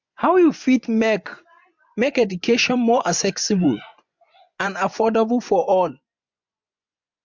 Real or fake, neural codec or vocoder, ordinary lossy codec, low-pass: fake; vocoder, 24 kHz, 100 mel bands, Vocos; MP3, 64 kbps; 7.2 kHz